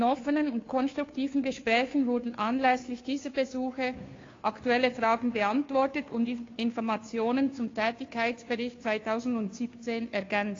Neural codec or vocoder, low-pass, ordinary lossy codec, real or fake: codec, 16 kHz, 2 kbps, FunCodec, trained on Chinese and English, 25 frames a second; 7.2 kHz; AAC, 32 kbps; fake